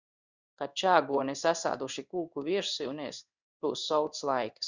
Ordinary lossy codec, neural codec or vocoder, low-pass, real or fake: Opus, 64 kbps; codec, 16 kHz in and 24 kHz out, 1 kbps, XY-Tokenizer; 7.2 kHz; fake